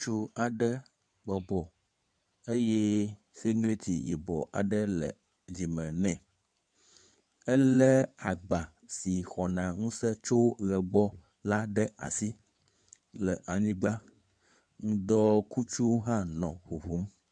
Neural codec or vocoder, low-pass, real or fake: codec, 16 kHz in and 24 kHz out, 2.2 kbps, FireRedTTS-2 codec; 9.9 kHz; fake